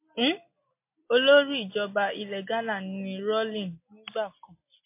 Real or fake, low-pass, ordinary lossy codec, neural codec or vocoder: real; 3.6 kHz; MP3, 24 kbps; none